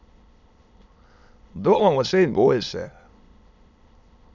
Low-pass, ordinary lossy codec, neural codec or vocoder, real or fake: 7.2 kHz; none; autoencoder, 22.05 kHz, a latent of 192 numbers a frame, VITS, trained on many speakers; fake